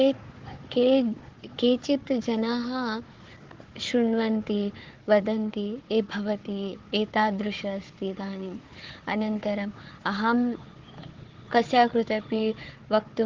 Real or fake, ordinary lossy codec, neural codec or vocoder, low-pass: fake; Opus, 16 kbps; codec, 16 kHz, 4 kbps, FunCodec, trained on Chinese and English, 50 frames a second; 7.2 kHz